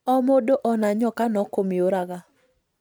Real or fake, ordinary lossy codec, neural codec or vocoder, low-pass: real; none; none; none